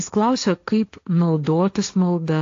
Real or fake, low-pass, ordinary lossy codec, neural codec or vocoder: fake; 7.2 kHz; AAC, 48 kbps; codec, 16 kHz, 1.1 kbps, Voila-Tokenizer